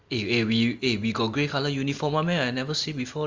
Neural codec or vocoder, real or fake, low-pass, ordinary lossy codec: none; real; 7.2 kHz; Opus, 32 kbps